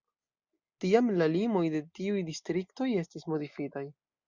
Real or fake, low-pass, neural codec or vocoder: real; 7.2 kHz; none